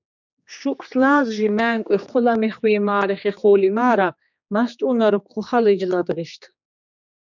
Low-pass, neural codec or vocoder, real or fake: 7.2 kHz; codec, 16 kHz, 2 kbps, X-Codec, HuBERT features, trained on general audio; fake